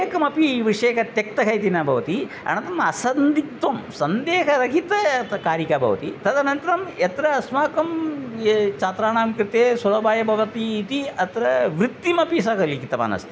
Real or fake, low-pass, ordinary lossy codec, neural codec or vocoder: real; none; none; none